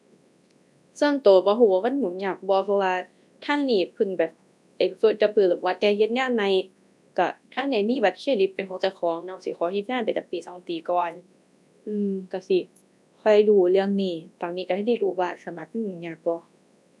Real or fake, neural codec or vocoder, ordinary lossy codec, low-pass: fake; codec, 24 kHz, 0.9 kbps, WavTokenizer, large speech release; none; none